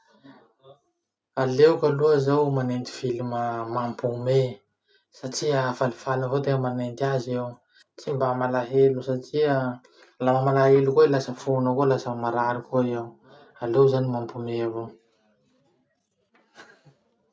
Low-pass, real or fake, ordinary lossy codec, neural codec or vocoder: none; real; none; none